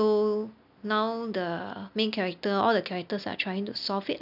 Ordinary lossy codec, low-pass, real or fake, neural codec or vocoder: none; 5.4 kHz; real; none